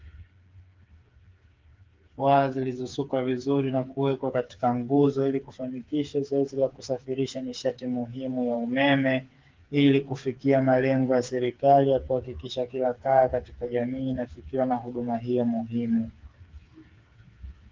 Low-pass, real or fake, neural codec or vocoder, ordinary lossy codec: 7.2 kHz; fake; codec, 16 kHz, 4 kbps, FreqCodec, smaller model; Opus, 32 kbps